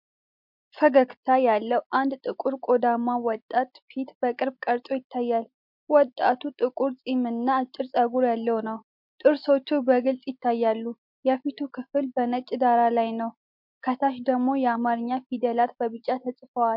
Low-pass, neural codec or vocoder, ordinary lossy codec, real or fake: 5.4 kHz; none; MP3, 48 kbps; real